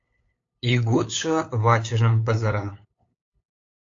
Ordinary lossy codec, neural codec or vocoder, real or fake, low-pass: AAC, 48 kbps; codec, 16 kHz, 8 kbps, FunCodec, trained on LibriTTS, 25 frames a second; fake; 7.2 kHz